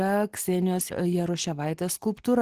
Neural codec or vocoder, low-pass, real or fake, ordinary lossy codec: none; 14.4 kHz; real; Opus, 16 kbps